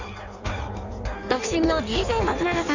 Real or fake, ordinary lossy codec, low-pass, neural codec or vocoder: fake; none; 7.2 kHz; codec, 16 kHz in and 24 kHz out, 1.1 kbps, FireRedTTS-2 codec